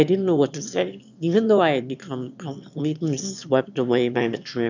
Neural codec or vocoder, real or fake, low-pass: autoencoder, 22.05 kHz, a latent of 192 numbers a frame, VITS, trained on one speaker; fake; 7.2 kHz